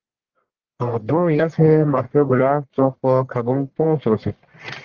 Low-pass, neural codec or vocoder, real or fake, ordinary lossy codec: 7.2 kHz; codec, 44.1 kHz, 1.7 kbps, Pupu-Codec; fake; Opus, 16 kbps